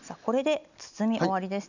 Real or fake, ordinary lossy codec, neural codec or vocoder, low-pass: real; none; none; 7.2 kHz